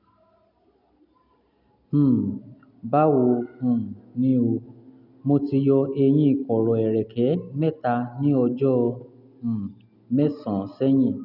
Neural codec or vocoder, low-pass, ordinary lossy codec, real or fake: none; 5.4 kHz; none; real